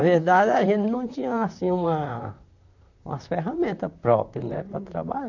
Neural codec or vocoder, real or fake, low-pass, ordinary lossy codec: vocoder, 44.1 kHz, 128 mel bands, Pupu-Vocoder; fake; 7.2 kHz; none